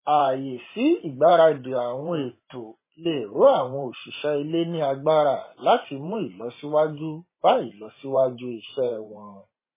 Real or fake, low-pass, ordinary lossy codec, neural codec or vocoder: fake; 3.6 kHz; MP3, 16 kbps; vocoder, 44.1 kHz, 128 mel bands every 512 samples, BigVGAN v2